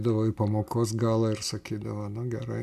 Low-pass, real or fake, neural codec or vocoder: 14.4 kHz; real; none